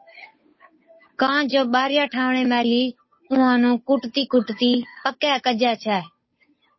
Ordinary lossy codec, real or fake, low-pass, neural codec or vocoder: MP3, 24 kbps; fake; 7.2 kHz; codec, 16 kHz, 8 kbps, FunCodec, trained on Chinese and English, 25 frames a second